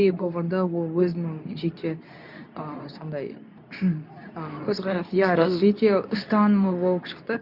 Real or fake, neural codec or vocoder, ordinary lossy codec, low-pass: fake; codec, 24 kHz, 0.9 kbps, WavTokenizer, medium speech release version 2; none; 5.4 kHz